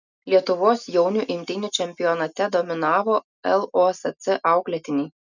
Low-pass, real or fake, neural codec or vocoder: 7.2 kHz; real; none